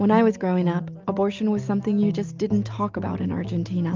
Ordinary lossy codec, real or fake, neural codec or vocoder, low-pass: Opus, 32 kbps; real; none; 7.2 kHz